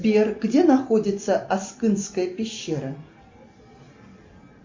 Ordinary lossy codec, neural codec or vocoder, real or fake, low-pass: MP3, 48 kbps; none; real; 7.2 kHz